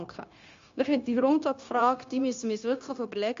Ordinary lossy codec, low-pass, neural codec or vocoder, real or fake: MP3, 48 kbps; 7.2 kHz; codec, 16 kHz, 0.9 kbps, LongCat-Audio-Codec; fake